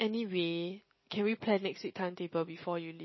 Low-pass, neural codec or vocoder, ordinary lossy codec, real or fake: 7.2 kHz; none; MP3, 24 kbps; real